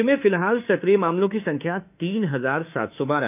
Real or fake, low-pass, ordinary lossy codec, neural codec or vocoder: fake; 3.6 kHz; none; autoencoder, 48 kHz, 32 numbers a frame, DAC-VAE, trained on Japanese speech